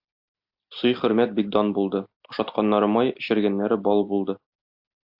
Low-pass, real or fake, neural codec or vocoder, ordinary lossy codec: 5.4 kHz; real; none; AAC, 48 kbps